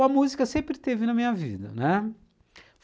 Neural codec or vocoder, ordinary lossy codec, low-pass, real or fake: none; none; none; real